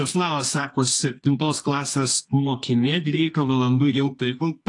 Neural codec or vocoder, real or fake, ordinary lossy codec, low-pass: codec, 24 kHz, 0.9 kbps, WavTokenizer, medium music audio release; fake; AAC, 48 kbps; 10.8 kHz